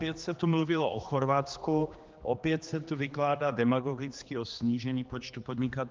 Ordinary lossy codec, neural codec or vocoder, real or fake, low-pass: Opus, 32 kbps; codec, 16 kHz, 2 kbps, X-Codec, HuBERT features, trained on general audio; fake; 7.2 kHz